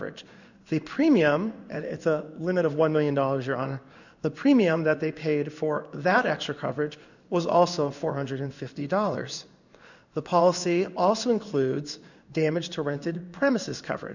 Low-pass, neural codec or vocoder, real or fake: 7.2 kHz; codec, 16 kHz in and 24 kHz out, 1 kbps, XY-Tokenizer; fake